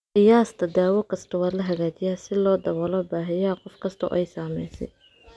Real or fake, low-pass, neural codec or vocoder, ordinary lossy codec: real; none; none; none